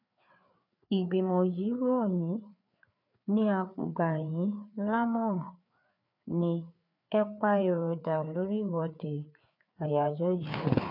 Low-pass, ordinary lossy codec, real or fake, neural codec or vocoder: 5.4 kHz; none; fake; codec, 16 kHz, 4 kbps, FreqCodec, larger model